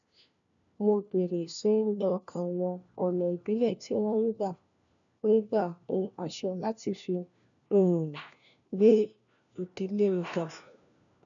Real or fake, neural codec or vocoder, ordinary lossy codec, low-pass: fake; codec, 16 kHz, 1 kbps, FunCodec, trained on LibriTTS, 50 frames a second; none; 7.2 kHz